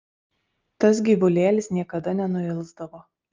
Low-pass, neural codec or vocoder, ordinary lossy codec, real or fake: 7.2 kHz; none; Opus, 24 kbps; real